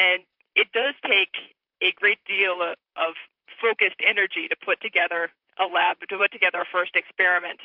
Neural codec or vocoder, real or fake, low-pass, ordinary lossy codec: vocoder, 44.1 kHz, 128 mel bands, Pupu-Vocoder; fake; 5.4 kHz; MP3, 48 kbps